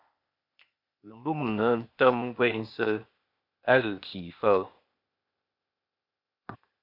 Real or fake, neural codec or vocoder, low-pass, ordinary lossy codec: fake; codec, 16 kHz, 0.8 kbps, ZipCodec; 5.4 kHz; MP3, 48 kbps